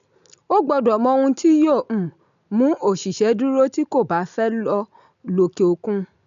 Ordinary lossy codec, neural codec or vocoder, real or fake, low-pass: none; none; real; 7.2 kHz